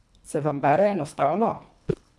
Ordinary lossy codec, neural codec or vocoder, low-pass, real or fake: none; codec, 24 kHz, 1.5 kbps, HILCodec; none; fake